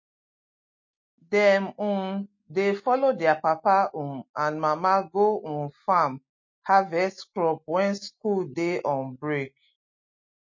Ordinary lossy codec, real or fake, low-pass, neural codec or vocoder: MP3, 32 kbps; real; 7.2 kHz; none